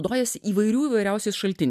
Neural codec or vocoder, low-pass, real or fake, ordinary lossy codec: none; 14.4 kHz; real; MP3, 96 kbps